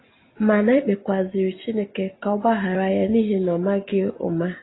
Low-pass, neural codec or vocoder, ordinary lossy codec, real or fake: 7.2 kHz; none; AAC, 16 kbps; real